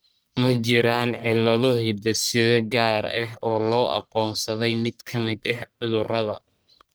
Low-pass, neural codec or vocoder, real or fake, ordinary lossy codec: none; codec, 44.1 kHz, 1.7 kbps, Pupu-Codec; fake; none